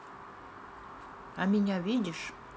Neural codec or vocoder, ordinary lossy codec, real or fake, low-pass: none; none; real; none